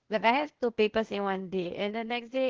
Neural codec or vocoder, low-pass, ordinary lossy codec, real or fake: codec, 16 kHz, 0.8 kbps, ZipCodec; 7.2 kHz; Opus, 16 kbps; fake